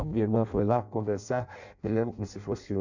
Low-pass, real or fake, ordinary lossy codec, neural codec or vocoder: 7.2 kHz; fake; none; codec, 16 kHz in and 24 kHz out, 0.6 kbps, FireRedTTS-2 codec